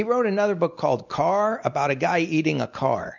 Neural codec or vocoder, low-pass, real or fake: none; 7.2 kHz; real